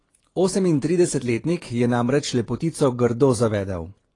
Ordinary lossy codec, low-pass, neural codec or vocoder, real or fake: AAC, 32 kbps; 10.8 kHz; vocoder, 24 kHz, 100 mel bands, Vocos; fake